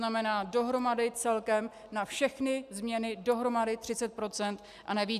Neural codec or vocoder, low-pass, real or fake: none; 14.4 kHz; real